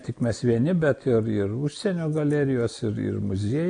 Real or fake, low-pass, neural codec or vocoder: real; 9.9 kHz; none